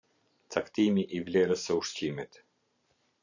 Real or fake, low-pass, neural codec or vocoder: fake; 7.2 kHz; vocoder, 44.1 kHz, 128 mel bands every 512 samples, BigVGAN v2